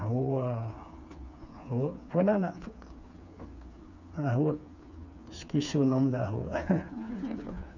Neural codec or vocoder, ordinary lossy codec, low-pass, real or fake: codec, 16 kHz, 4 kbps, FreqCodec, smaller model; none; 7.2 kHz; fake